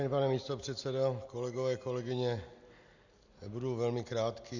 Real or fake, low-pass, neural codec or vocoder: real; 7.2 kHz; none